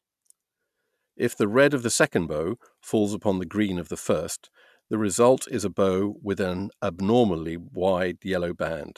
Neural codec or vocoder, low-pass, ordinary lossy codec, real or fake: none; 14.4 kHz; none; real